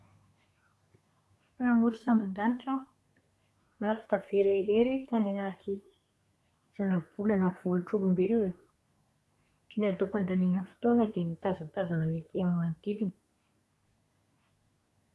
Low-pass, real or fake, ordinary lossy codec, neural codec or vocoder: none; fake; none; codec, 24 kHz, 1 kbps, SNAC